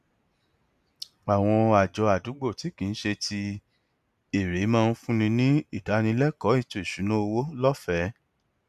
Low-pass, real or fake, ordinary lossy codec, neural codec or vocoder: 14.4 kHz; real; none; none